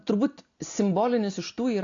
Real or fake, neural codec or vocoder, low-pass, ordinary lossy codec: real; none; 7.2 kHz; AAC, 48 kbps